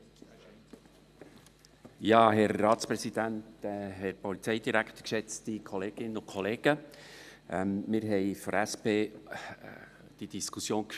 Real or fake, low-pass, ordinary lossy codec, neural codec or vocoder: real; 14.4 kHz; none; none